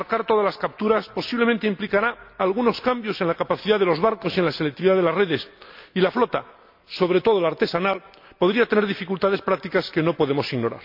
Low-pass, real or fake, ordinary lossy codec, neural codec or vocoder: 5.4 kHz; real; MP3, 48 kbps; none